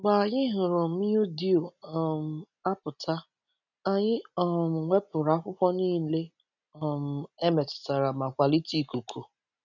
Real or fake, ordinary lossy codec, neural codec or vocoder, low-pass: real; none; none; 7.2 kHz